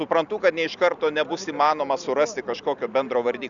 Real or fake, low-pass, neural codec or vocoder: real; 7.2 kHz; none